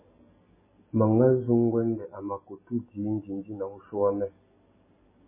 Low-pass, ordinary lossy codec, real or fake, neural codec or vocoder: 3.6 kHz; MP3, 16 kbps; real; none